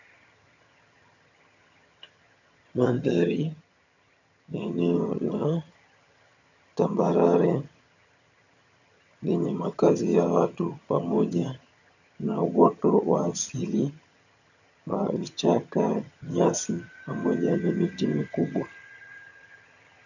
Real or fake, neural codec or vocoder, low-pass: fake; vocoder, 22.05 kHz, 80 mel bands, HiFi-GAN; 7.2 kHz